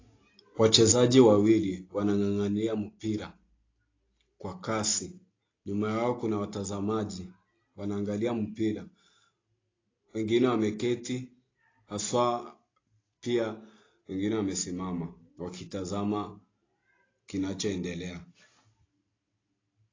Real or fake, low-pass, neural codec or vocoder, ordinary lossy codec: real; 7.2 kHz; none; AAC, 32 kbps